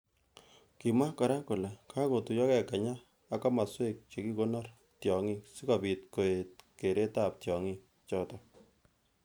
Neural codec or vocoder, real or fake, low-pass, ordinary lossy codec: none; real; none; none